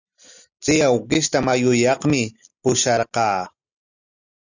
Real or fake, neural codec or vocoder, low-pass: real; none; 7.2 kHz